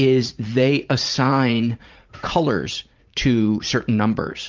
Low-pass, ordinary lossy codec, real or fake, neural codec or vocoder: 7.2 kHz; Opus, 24 kbps; fake; vocoder, 44.1 kHz, 80 mel bands, Vocos